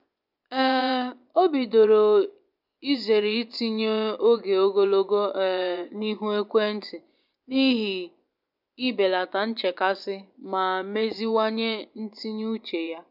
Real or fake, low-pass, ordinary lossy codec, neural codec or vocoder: fake; 5.4 kHz; none; vocoder, 24 kHz, 100 mel bands, Vocos